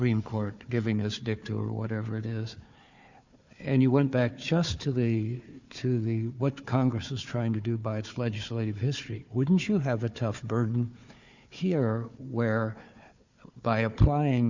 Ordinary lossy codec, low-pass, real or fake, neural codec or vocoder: Opus, 64 kbps; 7.2 kHz; fake; codec, 16 kHz, 4 kbps, FunCodec, trained on Chinese and English, 50 frames a second